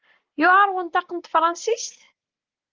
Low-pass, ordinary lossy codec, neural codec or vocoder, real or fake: 7.2 kHz; Opus, 16 kbps; none; real